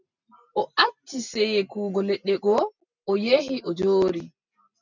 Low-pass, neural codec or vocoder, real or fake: 7.2 kHz; none; real